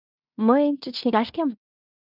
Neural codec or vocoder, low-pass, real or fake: codec, 16 kHz in and 24 kHz out, 0.9 kbps, LongCat-Audio-Codec, fine tuned four codebook decoder; 5.4 kHz; fake